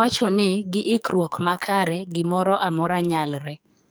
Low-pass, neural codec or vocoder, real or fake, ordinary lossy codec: none; codec, 44.1 kHz, 2.6 kbps, SNAC; fake; none